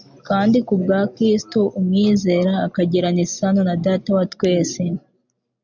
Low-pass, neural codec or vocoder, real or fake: 7.2 kHz; none; real